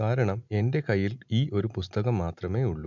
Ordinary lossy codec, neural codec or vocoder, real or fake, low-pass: MP3, 64 kbps; none; real; 7.2 kHz